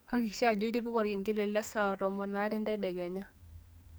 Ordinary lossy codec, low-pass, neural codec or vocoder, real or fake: none; none; codec, 44.1 kHz, 2.6 kbps, SNAC; fake